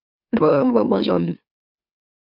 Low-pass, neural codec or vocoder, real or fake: 5.4 kHz; autoencoder, 44.1 kHz, a latent of 192 numbers a frame, MeloTTS; fake